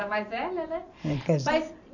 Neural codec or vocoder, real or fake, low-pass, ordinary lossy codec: vocoder, 44.1 kHz, 128 mel bands every 512 samples, BigVGAN v2; fake; 7.2 kHz; none